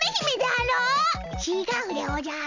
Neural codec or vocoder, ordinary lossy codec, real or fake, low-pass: none; none; real; 7.2 kHz